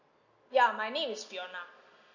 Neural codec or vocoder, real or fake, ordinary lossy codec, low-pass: none; real; MP3, 48 kbps; 7.2 kHz